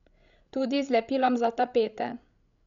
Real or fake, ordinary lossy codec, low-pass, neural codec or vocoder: fake; none; 7.2 kHz; codec, 16 kHz, 16 kbps, FreqCodec, larger model